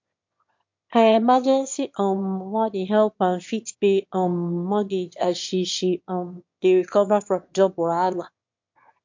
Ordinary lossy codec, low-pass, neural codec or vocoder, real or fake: MP3, 48 kbps; 7.2 kHz; autoencoder, 22.05 kHz, a latent of 192 numbers a frame, VITS, trained on one speaker; fake